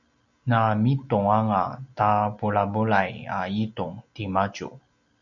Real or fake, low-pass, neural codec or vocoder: real; 7.2 kHz; none